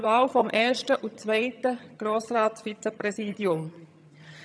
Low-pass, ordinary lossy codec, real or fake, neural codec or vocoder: none; none; fake; vocoder, 22.05 kHz, 80 mel bands, HiFi-GAN